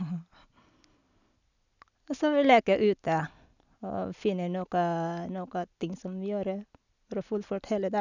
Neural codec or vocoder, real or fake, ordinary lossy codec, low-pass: none; real; none; 7.2 kHz